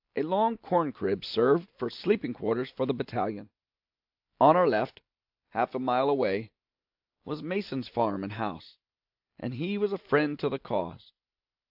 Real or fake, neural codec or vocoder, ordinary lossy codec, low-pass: real; none; AAC, 48 kbps; 5.4 kHz